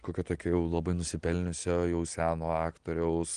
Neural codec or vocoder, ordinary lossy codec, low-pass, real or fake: none; Opus, 24 kbps; 9.9 kHz; real